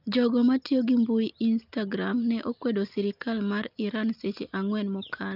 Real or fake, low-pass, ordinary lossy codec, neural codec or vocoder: real; 5.4 kHz; Opus, 24 kbps; none